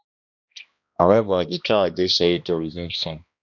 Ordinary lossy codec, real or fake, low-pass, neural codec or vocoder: none; fake; 7.2 kHz; codec, 16 kHz, 1 kbps, X-Codec, HuBERT features, trained on balanced general audio